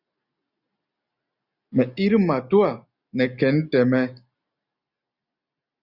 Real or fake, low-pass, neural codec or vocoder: real; 5.4 kHz; none